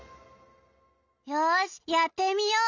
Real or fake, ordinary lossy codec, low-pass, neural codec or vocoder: real; none; 7.2 kHz; none